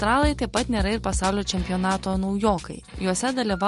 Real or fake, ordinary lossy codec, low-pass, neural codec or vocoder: real; MP3, 48 kbps; 14.4 kHz; none